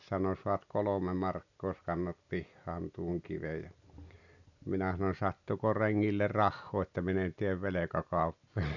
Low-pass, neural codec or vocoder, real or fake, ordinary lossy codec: 7.2 kHz; none; real; none